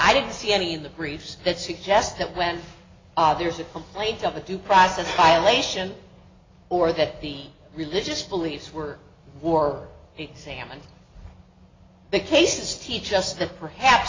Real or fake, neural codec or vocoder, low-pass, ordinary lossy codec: real; none; 7.2 kHz; AAC, 32 kbps